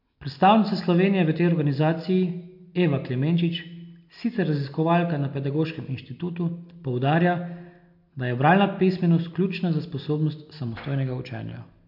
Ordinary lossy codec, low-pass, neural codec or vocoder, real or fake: MP3, 48 kbps; 5.4 kHz; none; real